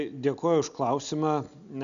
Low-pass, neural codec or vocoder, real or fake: 7.2 kHz; none; real